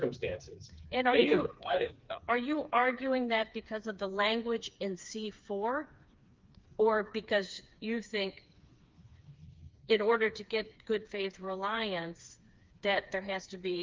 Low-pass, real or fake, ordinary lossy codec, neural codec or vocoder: 7.2 kHz; fake; Opus, 32 kbps; codec, 16 kHz, 4 kbps, FreqCodec, smaller model